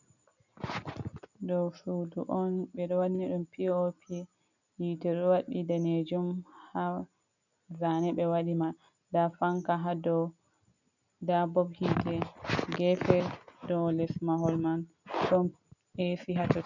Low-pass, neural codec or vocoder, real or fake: 7.2 kHz; none; real